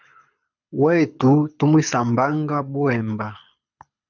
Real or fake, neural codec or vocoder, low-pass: fake; codec, 24 kHz, 6 kbps, HILCodec; 7.2 kHz